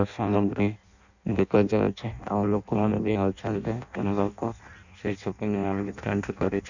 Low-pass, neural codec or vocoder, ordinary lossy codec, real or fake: 7.2 kHz; codec, 16 kHz in and 24 kHz out, 0.6 kbps, FireRedTTS-2 codec; none; fake